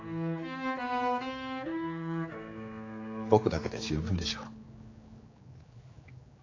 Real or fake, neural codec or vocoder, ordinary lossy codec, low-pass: fake; codec, 16 kHz, 4 kbps, X-Codec, HuBERT features, trained on general audio; AAC, 32 kbps; 7.2 kHz